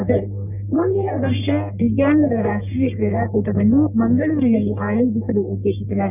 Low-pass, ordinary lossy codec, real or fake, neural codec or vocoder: 3.6 kHz; none; fake; codec, 44.1 kHz, 1.7 kbps, Pupu-Codec